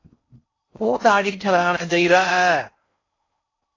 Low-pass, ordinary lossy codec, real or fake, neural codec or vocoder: 7.2 kHz; AAC, 32 kbps; fake; codec, 16 kHz in and 24 kHz out, 0.6 kbps, FocalCodec, streaming, 4096 codes